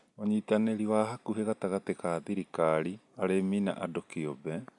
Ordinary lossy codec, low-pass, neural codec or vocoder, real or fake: MP3, 96 kbps; 10.8 kHz; none; real